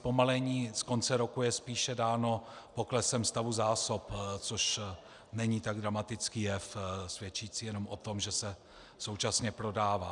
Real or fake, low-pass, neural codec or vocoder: real; 10.8 kHz; none